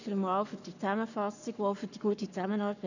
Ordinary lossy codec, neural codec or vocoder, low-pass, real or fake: none; codec, 44.1 kHz, 7.8 kbps, Pupu-Codec; 7.2 kHz; fake